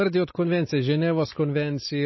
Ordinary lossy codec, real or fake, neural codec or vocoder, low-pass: MP3, 24 kbps; real; none; 7.2 kHz